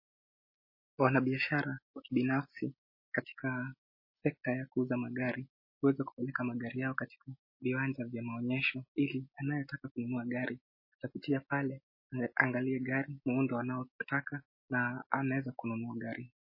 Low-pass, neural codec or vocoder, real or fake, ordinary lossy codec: 5.4 kHz; none; real; MP3, 24 kbps